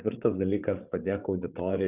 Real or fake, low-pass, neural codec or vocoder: fake; 3.6 kHz; codec, 16 kHz, 8 kbps, FreqCodec, larger model